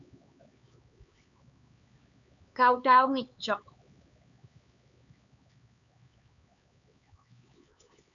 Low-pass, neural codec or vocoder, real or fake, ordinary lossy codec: 7.2 kHz; codec, 16 kHz, 4 kbps, X-Codec, HuBERT features, trained on LibriSpeech; fake; MP3, 96 kbps